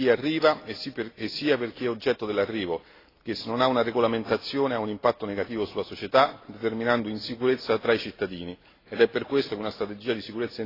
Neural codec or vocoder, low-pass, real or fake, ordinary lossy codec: none; 5.4 kHz; real; AAC, 24 kbps